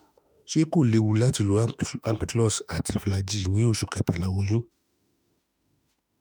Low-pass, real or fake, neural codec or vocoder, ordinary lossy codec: none; fake; autoencoder, 48 kHz, 32 numbers a frame, DAC-VAE, trained on Japanese speech; none